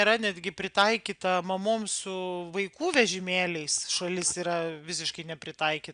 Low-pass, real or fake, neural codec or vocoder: 9.9 kHz; real; none